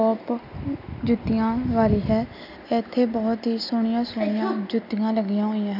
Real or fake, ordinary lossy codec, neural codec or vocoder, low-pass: real; none; none; 5.4 kHz